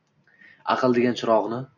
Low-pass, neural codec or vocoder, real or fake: 7.2 kHz; none; real